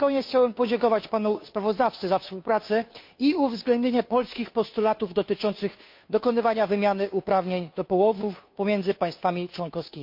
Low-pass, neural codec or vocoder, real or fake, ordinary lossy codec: 5.4 kHz; codec, 16 kHz, 2 kbps, FunCodec, trained on Chinese and English, 25 frames a second; fake; MP3, 32 kbps